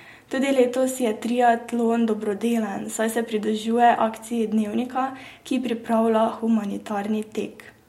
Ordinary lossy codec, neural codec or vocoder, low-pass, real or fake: MP3, 64 kbps; none; 19.8 kHz; real